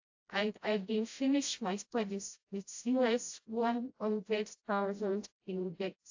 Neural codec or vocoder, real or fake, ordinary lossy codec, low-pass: codec, 16 kHz, 0.5 kbps, FreqCodec, smaller model; fake; AAC, 48 kbps; 7.2 kHz